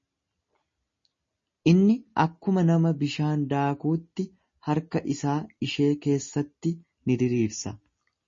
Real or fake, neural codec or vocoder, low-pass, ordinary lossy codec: real; none; 7.2 kHz; MP3, 32 kbps